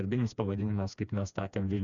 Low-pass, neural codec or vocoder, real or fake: 7.2 kHz; codec, 16 kHz, 2 kbps, FreqCodec, smaller model; fake